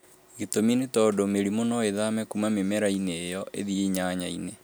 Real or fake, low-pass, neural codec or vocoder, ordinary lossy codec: real; none; none; none